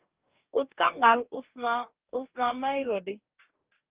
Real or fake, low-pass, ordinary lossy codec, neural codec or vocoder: fake; 3.6 kHz; Opus, 32 kbps; codec, 44.1 kHz, 2.6 kbps, DAC